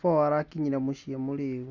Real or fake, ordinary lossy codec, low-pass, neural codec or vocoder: real; Opus, 64 kbps; 7.2 kHz; none